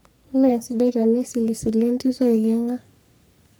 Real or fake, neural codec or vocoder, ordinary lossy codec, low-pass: fake; codec, 44.1 kHz, 3.4 kbps, Pupu-Codec; none; none